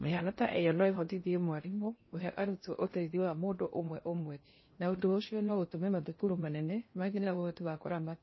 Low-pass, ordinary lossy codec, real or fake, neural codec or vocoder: 7.2 kHz; MP3, 24 kbps; fake; codec, 16 kHz in and 24 kHz out, 0.6 kbps, FocalCodec, streaming, 4096 codes